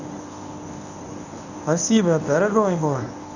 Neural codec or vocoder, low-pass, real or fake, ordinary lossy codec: codec, 24 kHz, 0.9 kbps, WavTokenizer, medium speech release version 1; 7.2 kHz; fake; none